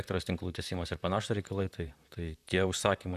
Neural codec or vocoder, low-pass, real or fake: vocoder, 44.1 kHz, 128 mel bands, Pupu-Vocoder; 14.4 kHz; fake